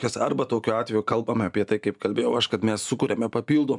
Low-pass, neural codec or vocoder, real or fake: 10.8 kHz; none; real